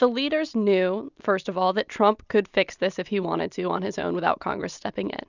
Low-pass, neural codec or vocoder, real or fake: 7.2 kHz; none; real